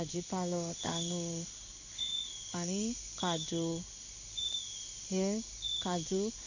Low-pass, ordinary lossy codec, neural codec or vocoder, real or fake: 7.2 kHz; none; codec, 16 kHz in and 24 kHz out, 1 kbps, XY-Tokenizer; fake